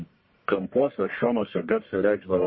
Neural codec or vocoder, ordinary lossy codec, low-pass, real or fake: codec, 44.1 kHz, 1.7 kbps, Pupu-Codec; MP3, 24 kbps; 7.2 kHz; fake